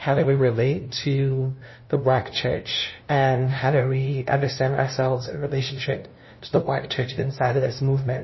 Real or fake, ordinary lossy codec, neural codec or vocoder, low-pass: fake; MP3, 24 kbps; codec, 16 kHz, 0.5 kbps, FunCodec, trained on LibriTTS, 25 frames a second; 7.2 kHz